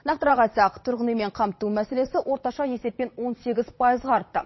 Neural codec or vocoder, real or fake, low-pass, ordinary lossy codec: vocoder, 22.05 kHz, 80 mel bands, WaveNeXt; fake; 7.2 kHz; MP3, 24 kbps